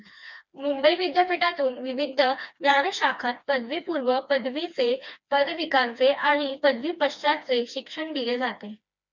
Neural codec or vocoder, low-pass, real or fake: codec, 16 kHz, 2 kbps, FreqCodec, smaller model; 7.2 kHz; fake